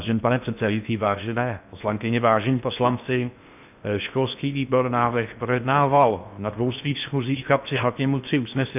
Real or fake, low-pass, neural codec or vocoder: fake; 3.6 kHz; codec, 16 kHz in and 24 kHz out, 0.6 kbps, FocalCodec, streaming, 4096 codes